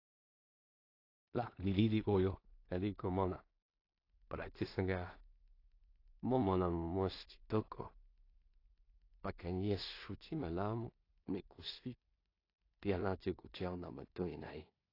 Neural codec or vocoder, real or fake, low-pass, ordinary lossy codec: codec, 16 kHz in and 24 kHz out, 0.4 kbps, LongCat-Audio-Codec, two codebook decoder; fake; 5.4 kHz; AAC, 32 kbps